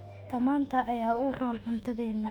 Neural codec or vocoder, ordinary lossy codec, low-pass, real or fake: autoencoder, 48 kHz, 32 numbers a frame, DAC-VAE, trained on Japanese speech; Opus, 64 kbps; 19.8 kHz; fake